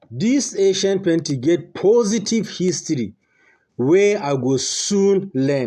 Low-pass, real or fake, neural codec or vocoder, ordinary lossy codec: 14.4 kHz; real; none; AAC, 96 kbps